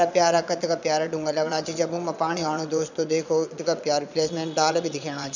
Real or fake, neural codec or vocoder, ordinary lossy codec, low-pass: fake; vocoder, 22.05 kHz, 80 mel bands, Vocos; none; 7.2 kHz